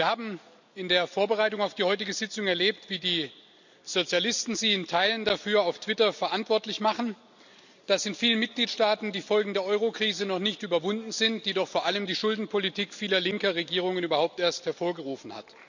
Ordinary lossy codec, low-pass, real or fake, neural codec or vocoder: none; 7.2 kHz; real; none